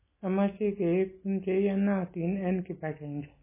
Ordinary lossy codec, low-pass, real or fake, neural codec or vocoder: MP3, 16 kbps; 3.6 kHz; real; none